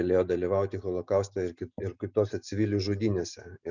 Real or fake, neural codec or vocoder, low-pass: real; none; 7.2 kHz